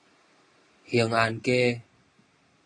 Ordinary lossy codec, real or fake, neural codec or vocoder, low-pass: AAC, 32 kbps; real; none; 9.9 kHz